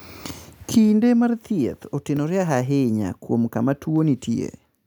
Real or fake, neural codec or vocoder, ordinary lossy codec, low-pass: real; none; none; none